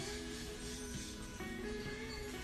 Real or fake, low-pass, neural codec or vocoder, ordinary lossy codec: fake; 14.4 kHz; vocoder, 48 kHz, 128 mel bands, Vocos; MP3, 96 kbps